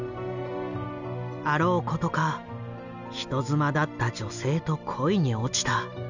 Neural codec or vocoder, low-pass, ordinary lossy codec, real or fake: none; 7.2 kHz; none; real